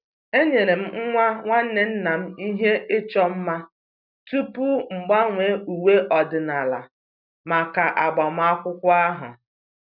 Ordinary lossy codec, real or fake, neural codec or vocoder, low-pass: none; real; none; 5.4 kHz